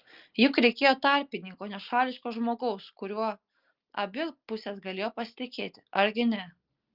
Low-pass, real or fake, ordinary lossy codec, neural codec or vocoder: 5.4 kHz; real; Opus, 32 kbps; none